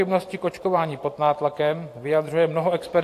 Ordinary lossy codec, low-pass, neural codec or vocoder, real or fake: AAC, 64 kbps; 14.4 kHz; vocoder, 44.1 kHz, 128 mel bands every 512 samples, BigVGAN v2; fake